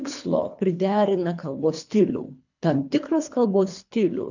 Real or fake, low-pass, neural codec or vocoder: fake; 7.2 kHz; codec, 24 kHz, 3 kbps, HILCodec